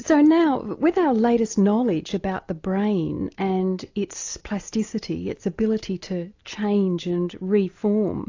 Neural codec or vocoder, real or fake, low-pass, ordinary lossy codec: none; real; 7.2 kHz; AAC, 48 kbps